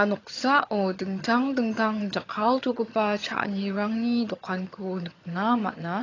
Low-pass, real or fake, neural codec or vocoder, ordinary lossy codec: 7.2 kHz; fake; vocoder, 22.05 kHz, 80 mel bands, HiFi-GAN; AAC, 32 kbps